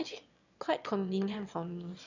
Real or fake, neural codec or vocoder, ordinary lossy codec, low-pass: fake; autoencoder, 22.05 kHz, a latent of 192 numbers a frame, VITS, trained on one speaker; none; 7.2 kHz